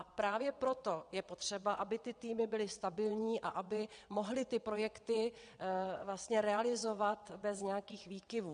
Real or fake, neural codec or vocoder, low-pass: fake; vocoder, 22.05 kHz, 80 mel bands, WaveNeXt; 9.9 kHz